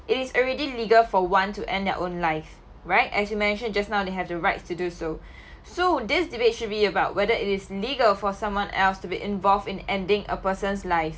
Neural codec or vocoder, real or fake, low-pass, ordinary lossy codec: none; real; none; none